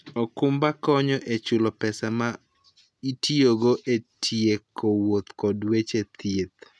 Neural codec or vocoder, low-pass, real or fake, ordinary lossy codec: none; none; real; none